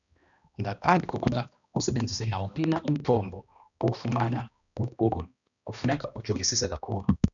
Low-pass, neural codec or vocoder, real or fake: 7.2 kHz; codec, 16 kHz, 1 kbps, X-Codec, HuBERT features, trained on balanced general audio; fake